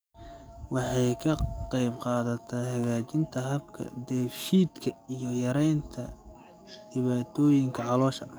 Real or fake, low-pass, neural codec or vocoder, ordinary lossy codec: fake; none; codec, 44.1 kHz, 7.8 kbps, DAC; none